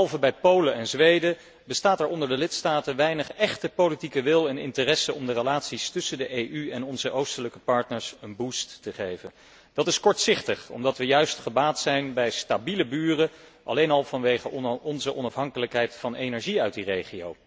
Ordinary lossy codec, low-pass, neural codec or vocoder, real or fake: none; none; none; real